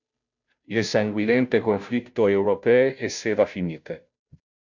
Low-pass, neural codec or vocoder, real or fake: 7.2 kHz; codec, 16 kHz, 0.5 kbps, FunCodec, trained on Chinese and English, 25 frames a second; fake